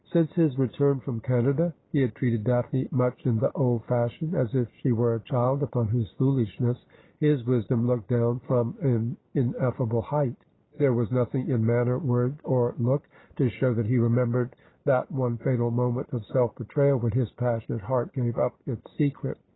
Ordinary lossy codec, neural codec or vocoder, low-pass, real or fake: AAC, 16 kbps; none; 7.2 kHz; real